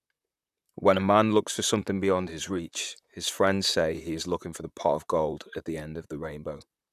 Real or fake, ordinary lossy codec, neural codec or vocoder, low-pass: fake; none; vocoder, 44.1 kHz, 128 mel bands, Pupu-Vocoder; 14.4 kHz